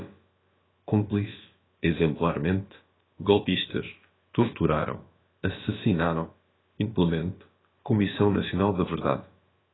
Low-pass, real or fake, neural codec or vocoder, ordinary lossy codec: 7.2 kHz; fake; codec, 16 kHz, about 1 kbps, DyCAST, with the encoder's durations; AAC, 16 kbps